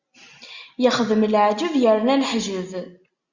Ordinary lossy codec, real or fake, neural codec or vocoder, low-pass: Opus, 64 kbps; real; none; 7.2 kHz